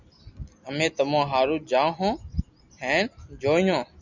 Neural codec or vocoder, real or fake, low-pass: none; real; 7.2 kHz